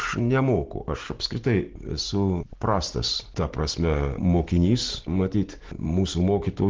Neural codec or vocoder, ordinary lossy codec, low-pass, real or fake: none; Opus, 16 kbps; 7.2 kHz; real